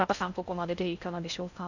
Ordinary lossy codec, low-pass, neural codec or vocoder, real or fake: none; 7.2 kHz; codec, 16 kHz in and 24 kHz out, 0.6 kbps, FocalCodec, streaming, 4096 codes; fake